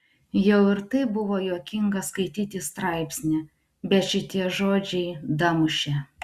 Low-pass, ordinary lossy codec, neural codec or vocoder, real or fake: 14.4 kHz; Opus, 64 kbps; none; real